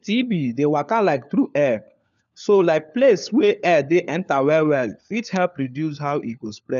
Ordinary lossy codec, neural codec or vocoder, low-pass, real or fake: none; codec, 16 kHz, 4 kbps, FunCodec, trained on LibriTTS, 50 frames a second; 7.2 kHz; fake